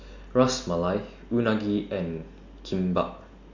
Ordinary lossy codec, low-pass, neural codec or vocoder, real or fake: none; 7.2 kHz; none; real